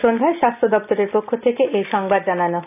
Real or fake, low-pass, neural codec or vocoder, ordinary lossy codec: real; 3.6 kHz; none; none